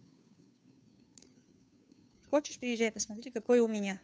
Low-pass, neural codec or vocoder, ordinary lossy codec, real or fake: none; codec, 16 kHz, 2 kbps, FunCodec, trained on Chinese and English, 25 frames a second; none; fake